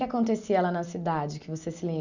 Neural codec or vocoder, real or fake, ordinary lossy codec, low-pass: none; real; none; 7.2 kHz